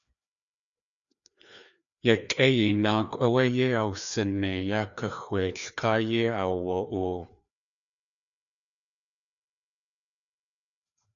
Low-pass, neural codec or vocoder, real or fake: 7.2 kHz; codec, 16 kHz, 2 kbps, FreqCodec, larger model; fake